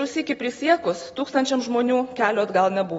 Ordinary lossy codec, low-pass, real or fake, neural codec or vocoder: AAC, 24 kbps; 19.8 kHz; real; none